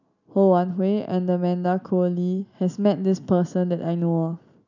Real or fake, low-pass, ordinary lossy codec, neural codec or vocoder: real; 7.2 kHz; none; none